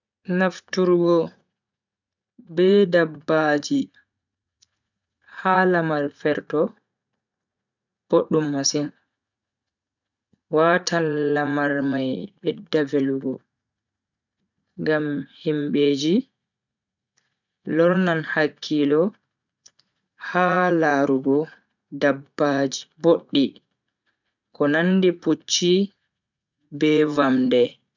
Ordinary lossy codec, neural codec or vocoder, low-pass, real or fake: none; vocoder, 44.1 kHz, 80 mel bands, Vocos; 7.2 kHz; fake